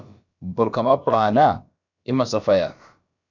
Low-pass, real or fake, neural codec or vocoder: 7.2 kHz; fake; codec, 16 kHz, about 1 kbps, DyCAST, with the encoder's durations